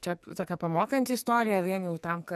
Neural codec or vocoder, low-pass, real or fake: codec, 44.1 kHz, 2.6 kbps, SNAC; 14.4 kHz; fake